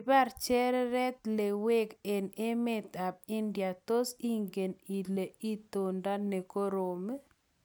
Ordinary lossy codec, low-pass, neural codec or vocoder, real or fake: none; none; none; real